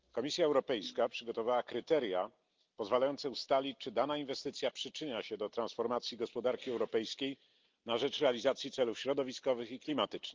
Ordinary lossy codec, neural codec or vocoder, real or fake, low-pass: Opus, 32 kbps; none; real; 7.2 kHz